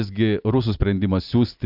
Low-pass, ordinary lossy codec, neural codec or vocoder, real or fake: 5.4 kHz; AAC, 48 kbps; none; real